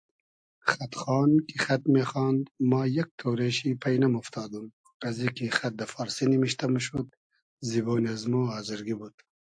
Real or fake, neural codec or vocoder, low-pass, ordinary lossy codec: real; none; 9.9 kHz; AAC, 64 kbps